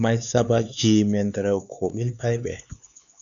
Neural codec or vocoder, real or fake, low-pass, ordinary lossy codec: codec, 16 kHz, 4 kbps, X-Codec, HuBERT features, trained on LibriSpeech; fake; 7.2 kHz; MP3, 96 kbps